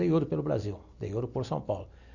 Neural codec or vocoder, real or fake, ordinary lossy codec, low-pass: none; real; none; 7.2 kHz